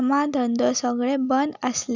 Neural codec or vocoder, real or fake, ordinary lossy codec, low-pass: none; real; none; 7.2 kHz